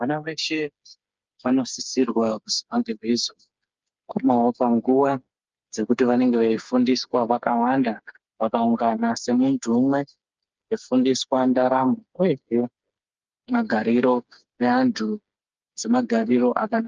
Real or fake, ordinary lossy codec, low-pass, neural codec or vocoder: fake; Opus, 24 kbps; 7.2 kHz; codec, 16 kHz, 4 kbps, FreqCodec, smaller model